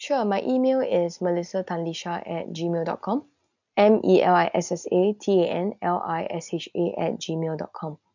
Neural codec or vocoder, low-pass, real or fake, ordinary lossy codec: none; 7.2 kHz; real; none